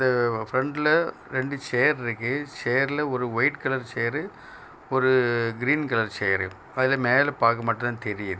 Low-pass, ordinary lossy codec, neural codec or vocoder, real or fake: none; none; none; real